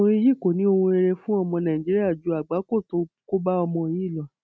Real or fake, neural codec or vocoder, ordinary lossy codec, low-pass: real; none; none; none